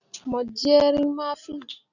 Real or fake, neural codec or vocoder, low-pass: real; none; 7.2 kHz